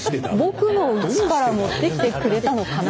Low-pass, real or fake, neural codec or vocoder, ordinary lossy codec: none; real; none; none